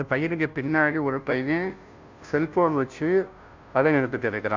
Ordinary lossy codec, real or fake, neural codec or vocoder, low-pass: MP3, 64 kbps; fake; codec, 16 kHz, 0.5 kbps, FunCodec, trained on Chinese and English, 25 frames a second; 7.2 kHz